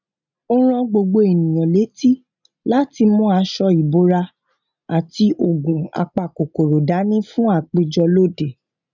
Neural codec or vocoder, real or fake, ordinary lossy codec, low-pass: none; real; none; 7.2 kHz